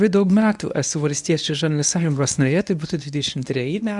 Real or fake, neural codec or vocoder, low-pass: fake; codec, 24 kHz, 0.9 kbps, WavTokenizer, medium speech release version 1; 10.8 kHz